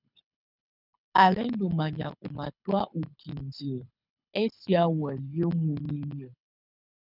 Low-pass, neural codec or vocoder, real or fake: 5.4 kHz; codec, 24 kHz, 6 kbps, HILCodec; fake